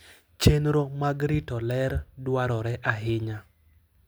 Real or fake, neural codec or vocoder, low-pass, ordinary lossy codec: real; none; none; none